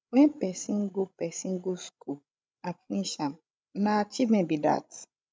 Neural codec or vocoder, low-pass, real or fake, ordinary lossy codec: codec, 16 kHz, 16 kbps, FreqCodec, larger model; none; fake; none